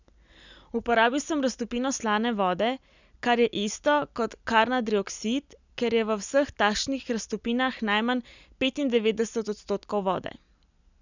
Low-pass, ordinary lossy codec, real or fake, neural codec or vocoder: 7.2 kHz; none; real; none